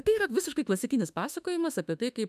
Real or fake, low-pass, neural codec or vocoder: fake; 14.4 kHz; autoencoder, 48 kHz, 32 numbers a frame, DAC-VAE, trained on Japanese speech